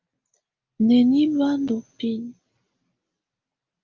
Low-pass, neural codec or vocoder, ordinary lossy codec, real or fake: 7.2 kHz; none; Opus, 24 kbps; real